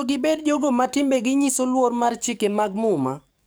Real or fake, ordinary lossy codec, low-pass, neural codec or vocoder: fake; none; none; codec, 44.1 kHz, 7.8 kbps, DAC